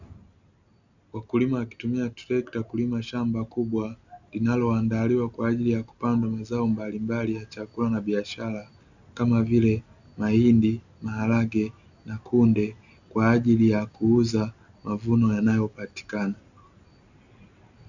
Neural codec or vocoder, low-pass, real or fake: none; 7.2 kHz; real